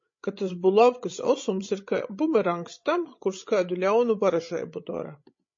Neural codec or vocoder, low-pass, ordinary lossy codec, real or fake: codec, 16 kHz, 16 kbps, FreqCodec, larger model; 7.2 kHz; MP3, 32 kbps; fake